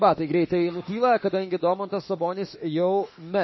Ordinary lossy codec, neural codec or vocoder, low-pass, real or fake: MP3, 24 kbps; autoencoder, 48 kHz, 32 numbers a frame, DAC-VAE, trained on Japanese speech; 7.2 kHz; fake